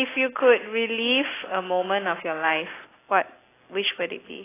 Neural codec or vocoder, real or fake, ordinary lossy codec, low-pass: none; real; AAC, 16 kbps; 3.6 kHz